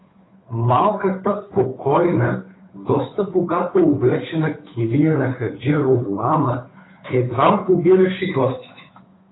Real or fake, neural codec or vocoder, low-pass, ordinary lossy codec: fake; codec, 16 kHz, 2 kbps, FunCodec, trained on Chinese and English, 25 frames a second; 7.2 kHz; AAC, 16 kbps